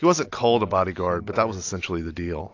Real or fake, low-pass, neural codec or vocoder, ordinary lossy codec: real; 7.2 kHz; none; AAC, 48 kbps